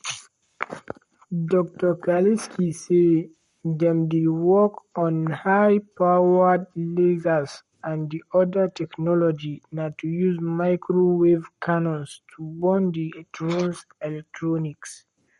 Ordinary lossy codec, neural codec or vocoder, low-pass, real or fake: MP3, 48 kbps; codec, 44.1 kHz, 7.8 kbps, Pupu-Codec; 19.8 kHz; fake